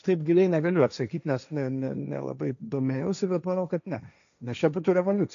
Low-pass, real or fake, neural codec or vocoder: 7.2 kHz; fake; codec, 16 kHz, 1.1 kbps, Voila-Tokenizer